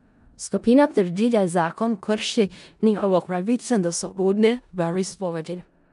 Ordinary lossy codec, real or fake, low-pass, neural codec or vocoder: none; fake; 10.8 kHz; codec, 16 kHz in and 24 kHz out, 0.4 kbps, LongCat-Audio-Codec, four codebook decoder